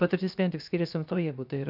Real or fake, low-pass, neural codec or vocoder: fake; 5.4 kHz; codec, 16 kHz, 0.7 kbps, FocalCodec